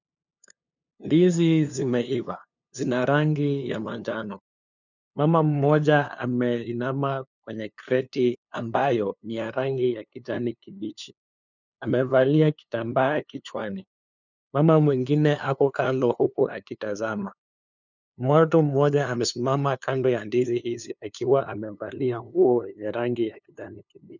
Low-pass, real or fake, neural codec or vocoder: 7.2 kHz; fake; codec, 16 kHz, 2 kbps, FunCodec, trained on LibriTTS, 25 frames a second